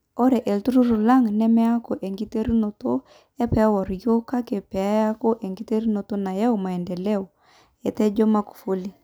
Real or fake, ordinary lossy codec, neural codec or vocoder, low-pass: real; none; none; none